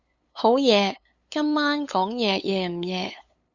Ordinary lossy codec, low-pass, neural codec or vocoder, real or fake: Opus, 64 kbps; 7.2 kHz; codec, 16 kHz, 8 kbps, FunCodec, trained on LibriTTS, 25 frames a second; fake